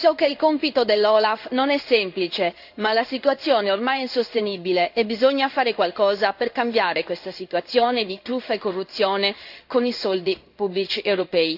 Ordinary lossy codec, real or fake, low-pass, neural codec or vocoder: AAC, 48 kbps; fake; 5.4 kHz; codec, 16 kHz in and 24 kHz out, 1 kbps, XY-Tokenizer